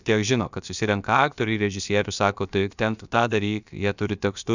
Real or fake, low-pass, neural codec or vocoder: fake; 7.2 kHz; codec, 16 kHz, about 1 kbps, DyCAST, with the encoder's durations